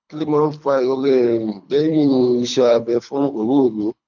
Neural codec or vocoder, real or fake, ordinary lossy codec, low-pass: codec, 24 kHz, 3 kbps, HILCodec; fake; none; 7.2 kHz